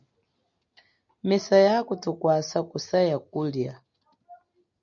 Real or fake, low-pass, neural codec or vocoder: real; 7.2 kHz; none